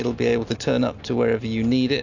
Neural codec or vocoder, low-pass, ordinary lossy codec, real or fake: none; 7.2 kHz; AAC, 48 kbps; real